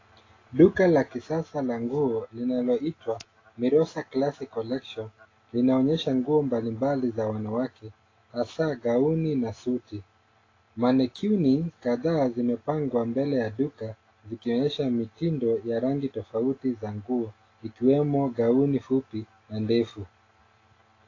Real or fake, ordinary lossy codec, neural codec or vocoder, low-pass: real; AAC, 32 kbps; none; 7.2 kHz